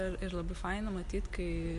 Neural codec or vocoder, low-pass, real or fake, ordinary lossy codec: none; 14.4 kHz; real; MP3, 48 kbps